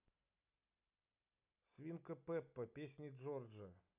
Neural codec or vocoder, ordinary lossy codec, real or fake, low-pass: none; none; real; 3.6 kHz